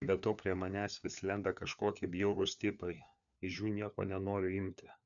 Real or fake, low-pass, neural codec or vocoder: fake; 7.2 kHz; codec, 16 kHz, 4 kbps, FunCodec, trained on LibriTTS, 50 frames a second